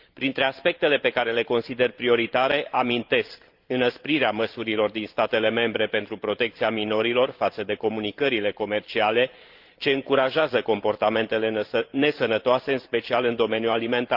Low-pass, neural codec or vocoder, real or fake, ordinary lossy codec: 5.4 kHz; vocoder, 44.1 kHz, 128 mel bands every 512 samples, BigVGAN v2; fake; Opus, 32 kbps